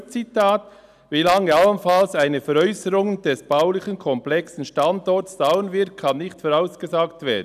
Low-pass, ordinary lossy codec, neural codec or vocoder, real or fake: 14.4 kHz; none; none; real